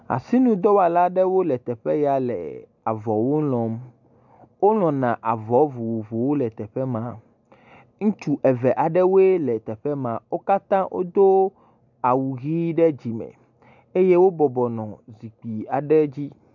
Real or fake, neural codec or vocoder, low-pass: real; none; 7.2 kHz